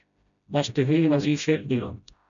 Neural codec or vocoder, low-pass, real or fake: codec, 16 kHz, 0.5 kbps, FreqCodec, smaller model; 7.2 kHz; fake